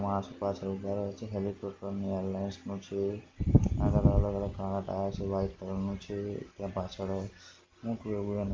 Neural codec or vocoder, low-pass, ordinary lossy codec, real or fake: none; 7.2 kHz; Opus, 32 kbps; real